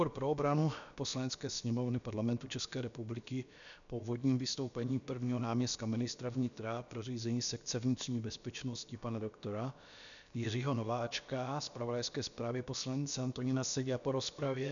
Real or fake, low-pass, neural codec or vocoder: fake; 7.2 kHz; codec, 16 kHz, about 1 kbps, DyCAST, with the encoder's durations